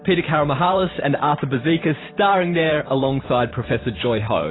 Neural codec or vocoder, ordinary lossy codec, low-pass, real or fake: vocoder, 44.1 kHz, 128 mel bands every 512 samples, BigVGAN v2; AAC, 16 kbps; 7.2 kHz; fake